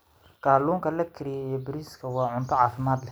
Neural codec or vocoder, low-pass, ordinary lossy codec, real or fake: none; none; none; real